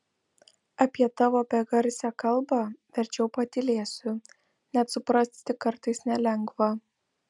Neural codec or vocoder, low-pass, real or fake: none; 10.8 kHz; real